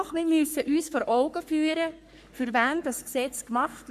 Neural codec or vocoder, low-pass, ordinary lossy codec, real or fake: codec, 44.1 kHz, 3.4 kbps, Pupu-Codec; 14.4 kHz; none; fake